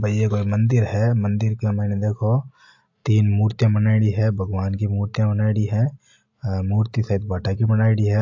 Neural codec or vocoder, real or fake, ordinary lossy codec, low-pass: none; real; AAC, 48 kbps; 7.2 kHz